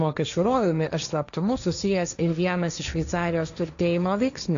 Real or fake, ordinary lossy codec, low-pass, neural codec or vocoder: fake; AAC, 96 kbps; 7.2 kHz; codec, 16 kHz, 1.1 kbps, Voila-Tokenizer